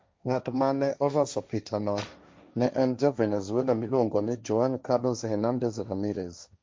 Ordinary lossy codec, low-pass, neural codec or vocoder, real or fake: none; none; codec, 16 kHz, 1.1 kbps, Voila-Tokenizer; fake